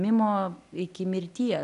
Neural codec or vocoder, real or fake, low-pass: none; real; 10.8 kHz